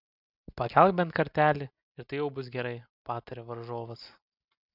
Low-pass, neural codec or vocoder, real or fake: 5.4 kHz; none; real